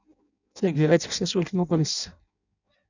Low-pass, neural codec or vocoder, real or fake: 7.2 kHz; codec, 16 kHz in and 24 kHz out, 0.6 kbps, FireRedTTS-2 codec; fake